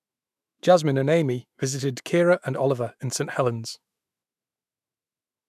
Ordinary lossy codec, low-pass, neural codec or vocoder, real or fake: none; 14.4 kHz; autoencoder, 48 kHz, 128 numbers a frame, DAC-VAE, trained on Japanese speech; fake